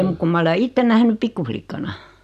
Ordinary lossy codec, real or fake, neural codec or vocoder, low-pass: none; real; none; 14.4 kHz